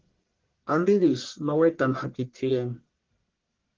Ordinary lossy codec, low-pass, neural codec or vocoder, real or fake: Opus, 16 kbps; 7.2 kHz; codec, 44.1 kHz, 1.7 kbps, Pupu-Codec; fake